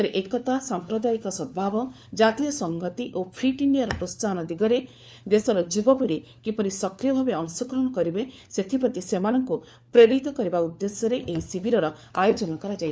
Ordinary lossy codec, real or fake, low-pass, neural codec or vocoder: none; fake; none; codec, 16 kHz, 4 kbps, FunCodec, trained on LibriTTS, 50 frames a second